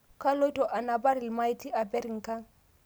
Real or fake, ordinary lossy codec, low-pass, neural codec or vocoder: real; none; none; none